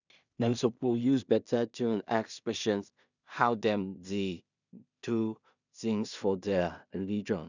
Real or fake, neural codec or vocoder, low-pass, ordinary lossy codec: fake; codec, 16 kHz in and 24 kHz out, 0.4 kbps, LongCat-Audio-Codec, two codebook decoder; 7.2 kHz; none